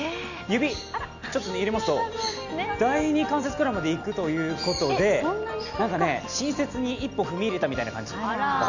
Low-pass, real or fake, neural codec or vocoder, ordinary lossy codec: 7.2 kHz; real; none; none